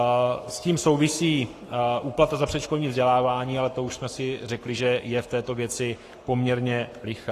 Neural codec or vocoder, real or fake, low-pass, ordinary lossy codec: codec, 44.1 kHz, 7.8 kbps, Pupu-Codec; fake; 14.4 kHz; AAC, 48 kbps